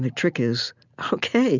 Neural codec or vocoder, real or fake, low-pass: none; real; 7.2 kHz